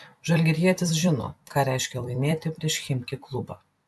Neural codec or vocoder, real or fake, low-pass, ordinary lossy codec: vocoder, 44.1 kHz, 128 mel bands every 256 samples, BigVGAN v2; fake; 14.4 kHz; MP3, 96 kbps